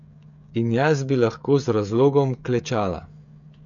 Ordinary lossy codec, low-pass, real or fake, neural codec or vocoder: none; 7.2 kHz; fake; codec, 16 kHz, 16 kbps, FreqCodec, smaller model